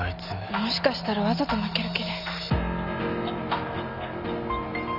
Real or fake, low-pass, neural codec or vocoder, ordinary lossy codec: real; 5.4 kHz; none; none